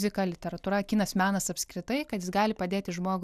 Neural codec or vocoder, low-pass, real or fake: none; 14.4 kHz; real